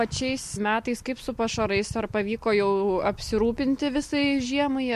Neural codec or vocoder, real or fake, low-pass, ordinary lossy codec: none; real; 14.4 kHz; MP3, 64 kbps